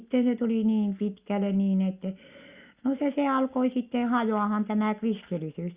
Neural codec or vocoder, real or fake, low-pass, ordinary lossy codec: none; real; 3.6 kHz; Opus, 64 kbps